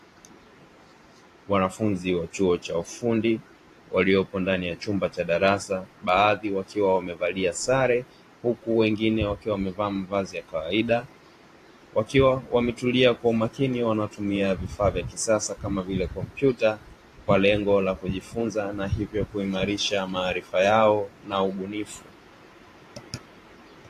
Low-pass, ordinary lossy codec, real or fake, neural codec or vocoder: 14.4 kHz; AAC, 48 kbps; fake; vocoder, 44.1 kHz, 128 mel bands every 512 samples, BigVGAN v2